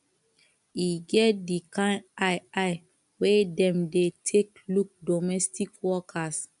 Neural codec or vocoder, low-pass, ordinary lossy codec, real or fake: none; 10.8 kHz; MP3, 96 kbps; real